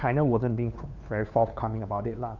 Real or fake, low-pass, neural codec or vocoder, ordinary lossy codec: fake; 7.2 kHz; codec, 16 kHz, 2 kbps, FunCodec, trained on Chinese and English, 25 frames a second; none